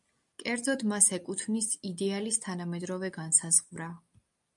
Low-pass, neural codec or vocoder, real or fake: 10.8 kHz; none; real